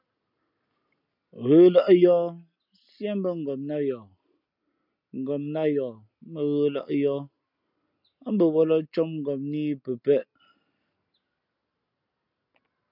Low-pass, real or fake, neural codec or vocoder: 5.4 kHz; real; none